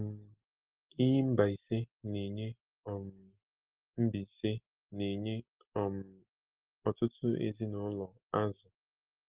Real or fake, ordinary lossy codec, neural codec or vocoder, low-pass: real; Opus, 16 kbps; none; 3.6 kHz